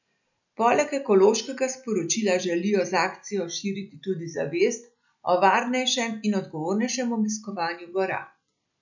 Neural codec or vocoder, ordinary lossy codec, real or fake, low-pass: none; none; real; 7.2 kHz